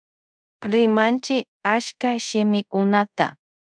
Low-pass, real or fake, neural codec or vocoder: 9.9 kHz; fake; codec, 24 kHz, 0.5 kbps, DualCodec